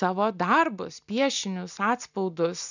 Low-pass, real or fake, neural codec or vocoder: 7.2 kHz; real; none